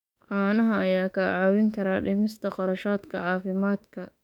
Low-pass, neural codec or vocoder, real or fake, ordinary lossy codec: 19.8 kHz; autoencoder, 48 kHz, 32 numbers a frame, DAC-VAE, trained on Japanese speech; fake; none